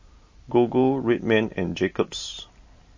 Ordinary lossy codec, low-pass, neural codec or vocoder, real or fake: MP3, 32 kbps; 7.2 kHz; none; real